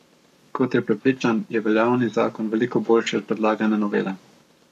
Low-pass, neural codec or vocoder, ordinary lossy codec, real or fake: 14.4 kHz; codec, 44.1 kHz, 7.8 kbps, Pupu-Codec; none; fake